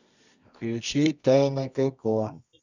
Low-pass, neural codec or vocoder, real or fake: 7.2 kHz; codec, 24 kHz, 0.9 kbps, WavTokenizer, medium music audio release; fake